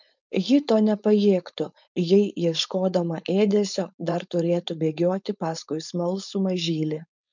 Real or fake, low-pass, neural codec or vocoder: fake; 7.2 kHz; codec, 16 kHz, 4.8 kbps, FACodec